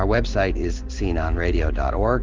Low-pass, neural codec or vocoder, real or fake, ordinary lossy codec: 7.2 kHz; codec, 16 kHz in and 24 kHz out, 1 kbps, XY-Tokenizer; fake; Opus, 16 kbps